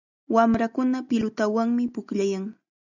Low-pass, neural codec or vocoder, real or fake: 7.2 kHz; none; real